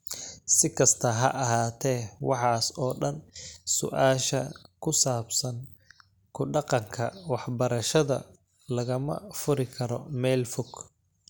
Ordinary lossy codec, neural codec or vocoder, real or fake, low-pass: none; none; real; none